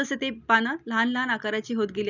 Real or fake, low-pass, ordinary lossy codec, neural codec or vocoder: real; 7.2 kHz; none; none